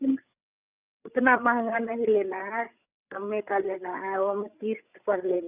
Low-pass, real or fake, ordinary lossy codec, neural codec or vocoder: 3.6 kHz; fake; Opus, 64 kbps; codec, 16 kHz, 8 kbps, FreqCodec, larger model